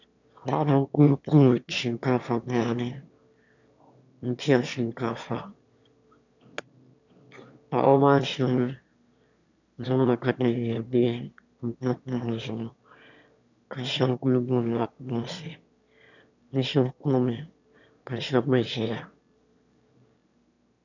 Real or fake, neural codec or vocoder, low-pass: fake; autoencoder, 22.05 kHz, a latent of 192 numbers a frame, VITS, trained on one speaker; 7.2 kHz